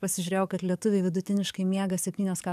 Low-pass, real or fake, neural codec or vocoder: 14.4 kHz; fake; codec, 44.1 kHz, 7.8 kbps, DAC